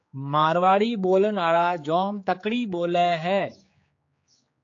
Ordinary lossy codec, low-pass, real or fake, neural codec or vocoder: AAC, 48 kbps; 7.2 kHz; fake; codec, 16 kHz, 4 kbps, X-Codec, HuBERT features, trained on general audio